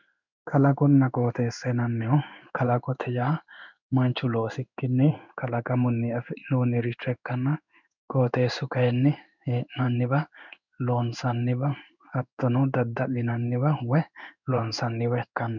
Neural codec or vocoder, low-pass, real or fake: codec, 16 kHz in and 24 kHz out, 1 kbps, XY-Tokenizer; 7.2 kHz; fake